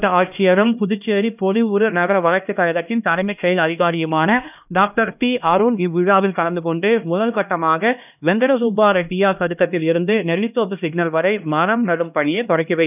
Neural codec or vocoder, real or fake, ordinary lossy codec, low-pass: codec, 16 kHz, 1 kbps, X-Codec, HuBERT features, trained on LibriSpeech; fake; none; 3.6 kHz